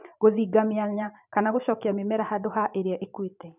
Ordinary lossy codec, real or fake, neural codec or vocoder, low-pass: none; fake; vocoder, 44.1 kHz, 128 mel bands every 512 samples, BigVGAN v2; 3.6 kHz